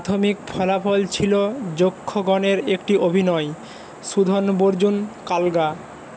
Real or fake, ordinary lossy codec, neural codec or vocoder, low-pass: real; none; none; none